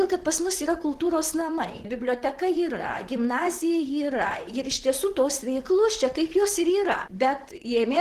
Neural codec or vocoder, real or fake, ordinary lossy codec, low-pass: vocoder, 44.1 kHz, 128 mel bands, Pupu-Vocoder; fake; Opus, 16 kbps; 14.4 kHz